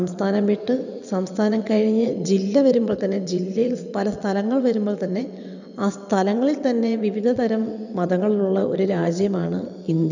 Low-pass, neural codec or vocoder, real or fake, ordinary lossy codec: 7.2 kHz; vocoder, 22.05 kHz, 80 mel bands, WaveNeXt; fake; none